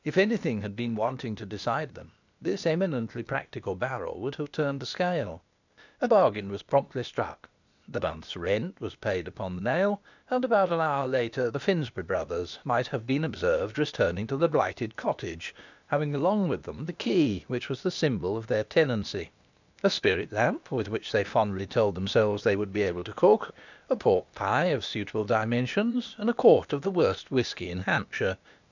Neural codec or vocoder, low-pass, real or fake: codec, 16 kHz, 0.8 kbps, ZipCodec; 7.2 kHz; fake